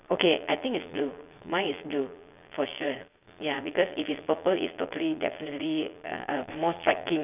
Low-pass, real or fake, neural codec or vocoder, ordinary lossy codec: 3.6 kHz; fake; vocoder, 22.05 kHz, 80 mel bands, Vocos; none